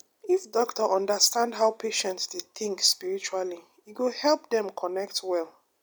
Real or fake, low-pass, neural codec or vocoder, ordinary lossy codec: real; none; none; none